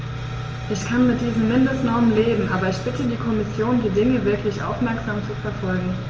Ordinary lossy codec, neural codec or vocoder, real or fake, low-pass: Opus, 24 kbps; none; real; 7.2 kHz